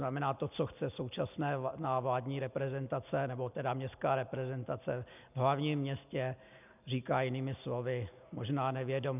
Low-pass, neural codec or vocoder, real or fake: 3.6 kHz; none; real